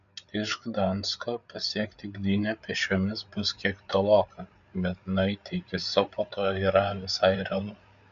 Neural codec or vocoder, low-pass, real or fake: codec, 16 kHz, 8 kbps, FreqCodec, larger model; 7.2 kHz; fake